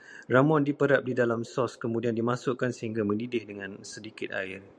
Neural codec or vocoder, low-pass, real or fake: vocoder, 44.1 kHz, 128 mel bands every 512 samples, BigVGAN v2; 9.9 kHz; fake